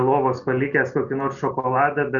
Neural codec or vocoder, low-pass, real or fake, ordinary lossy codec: none; 7.2 kHz; real; Opus, 64 kbps